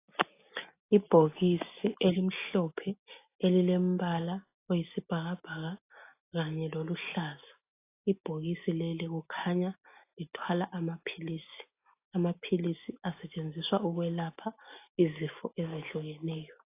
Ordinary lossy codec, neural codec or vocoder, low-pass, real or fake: AAC, 24 kbps; none; 3.6 kHz; real